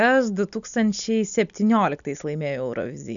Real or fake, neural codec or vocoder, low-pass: real; none; 7.2 kHz